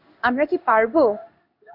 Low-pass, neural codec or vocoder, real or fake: 5.4 kHz; codec, 16 kHz in and 24 kHz out, 1 kbps, XY-Tokenizer; fake